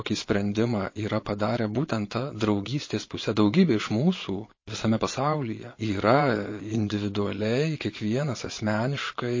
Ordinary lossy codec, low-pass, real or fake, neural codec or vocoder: MP3, 32 kbps; 7.2 kHz; fake; vocoder, 22.05 kHz, 80 mel bands, Vocos